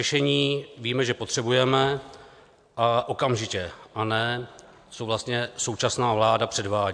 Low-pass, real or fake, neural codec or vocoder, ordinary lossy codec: 9.9 kHz; real; none; AAC, 64 kbps